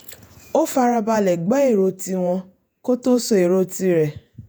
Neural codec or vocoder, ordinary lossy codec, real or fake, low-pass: vocoder, 48 kHz, 128 mel bands, Vocos; none; fake; none